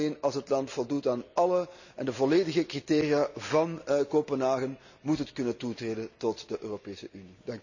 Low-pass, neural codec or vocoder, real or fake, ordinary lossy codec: 7.2 kHz; none; real; none